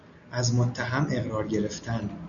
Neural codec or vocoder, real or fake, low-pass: none; real; 7.2 kHz